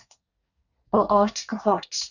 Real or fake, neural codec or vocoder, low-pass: fake; codec, 24 kHz, 1 kbps, SNAC; 7.2 kHz